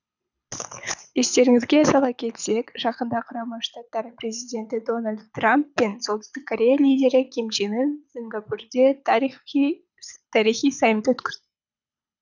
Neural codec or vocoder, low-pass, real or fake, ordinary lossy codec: codec, 24 kHz, 6 kbps, HILCodec; 7.2 kHz; fake; none